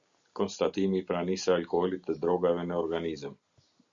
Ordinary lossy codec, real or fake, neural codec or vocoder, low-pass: Opus, 64 kbps; real; none; 7.2 kHz